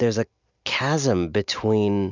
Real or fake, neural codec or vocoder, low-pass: real; none; 7.2 kHz